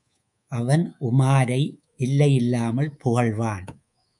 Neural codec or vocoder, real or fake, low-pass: codec, 24 kHz, 3.1 kbps, DualCodec; fake; 10.8 kHz